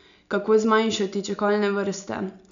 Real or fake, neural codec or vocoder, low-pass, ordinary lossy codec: real; none; 7.2 kHz; none